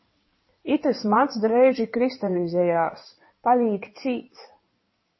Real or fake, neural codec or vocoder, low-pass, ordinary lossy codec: fake; codec, 16 kHz in and 24 kHz out, 2.2 kbps, FireRedTTS-2 codec; 7.2 kHz; MP3, 24 kbps